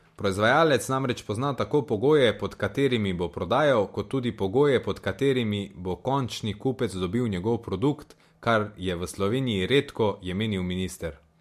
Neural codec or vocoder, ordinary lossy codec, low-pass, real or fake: none; MP3, 64 kbps; 14.4 kHz; real